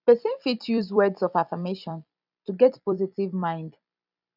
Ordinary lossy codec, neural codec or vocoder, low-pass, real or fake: none; vocoder, 44.1 kHz, 128 mel bands every 256 samples, BigVGAN v2; 5.4 kHz; fake